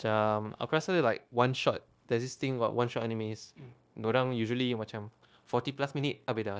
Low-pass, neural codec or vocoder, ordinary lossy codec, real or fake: none; codec, 16 kHz, 0.9 kbps, LongCat-Audio-Codec; none; fake